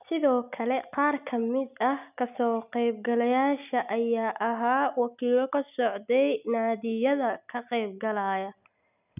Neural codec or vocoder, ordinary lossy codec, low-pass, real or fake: none; none; 3.6 kHz; real